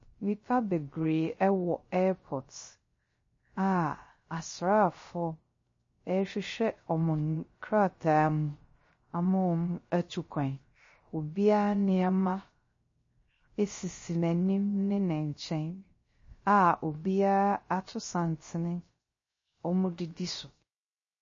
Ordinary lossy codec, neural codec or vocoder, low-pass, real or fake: MP3, 32 kbps; codec, 16 kHz, 0.3 kbps, FocalCodec; 7.2 kHz; fake